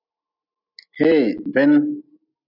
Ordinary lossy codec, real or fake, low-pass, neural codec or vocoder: AAC, 48 kbps; real; 5.4 kHz; none